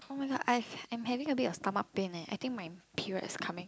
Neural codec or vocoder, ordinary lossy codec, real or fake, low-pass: none; none; real; none